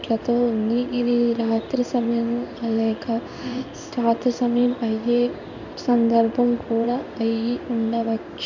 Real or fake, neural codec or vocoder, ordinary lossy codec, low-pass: fake; codec, 16 kHz in and 24 kHz out, 1 kbps, XY-Tokenizer; none; 7.2 kHz